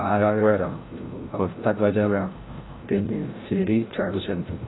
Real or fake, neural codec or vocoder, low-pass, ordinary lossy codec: fake; codec, 16 kHz, 0.5 kbps, FreqCodec, larger model; 7.2 kHz; AAC, 16 kbps